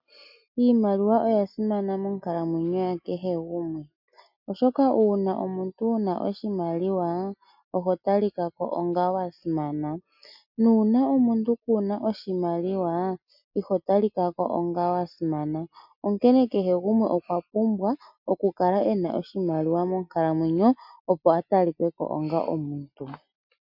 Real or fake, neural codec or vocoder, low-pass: real; none; 5.4 kHz